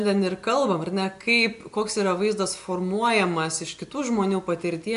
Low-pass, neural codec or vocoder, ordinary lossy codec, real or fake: 10.8 kHz; none; AAC, 96 kbps; real